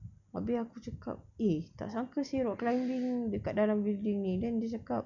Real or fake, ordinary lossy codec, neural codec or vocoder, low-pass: real; none; none; 7.2 kHz